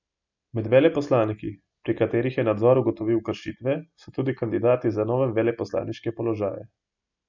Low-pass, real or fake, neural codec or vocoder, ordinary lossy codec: 7.2 kHz; real; none; none